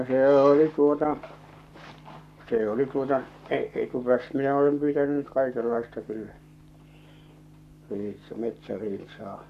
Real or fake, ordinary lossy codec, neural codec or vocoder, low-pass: fake; none; codec, 44.1 kHz, 7.8 kbps, Pupu-Codec; 14.4 kHz